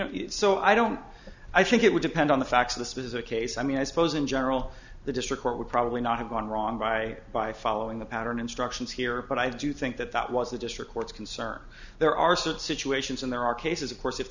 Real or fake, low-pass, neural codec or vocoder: real; 7.2 kHz; none